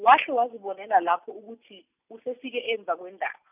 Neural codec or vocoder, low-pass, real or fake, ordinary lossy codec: none; 3.6 kHz; real; none